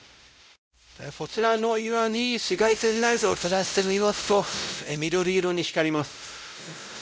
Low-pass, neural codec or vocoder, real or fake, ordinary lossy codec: none; codec, 16 kHz, 0.5 kbps, X-Codec, WavLM features, trained on Multilingual LibriSpeech; fake; none